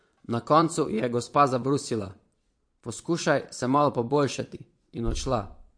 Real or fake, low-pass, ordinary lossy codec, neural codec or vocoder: fake; 9.9 kHz; MP3, 48 kbps; vocoder, 22.05 kHz, 80 mel bands, Vocos